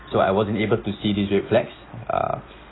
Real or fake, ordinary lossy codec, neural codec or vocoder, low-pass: real; AAC, 16 kbps; none; 7.2 kHz